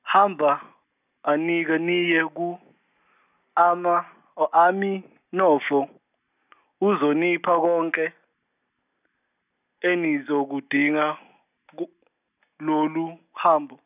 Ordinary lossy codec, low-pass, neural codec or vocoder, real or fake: none; 3.6 kHz; none; real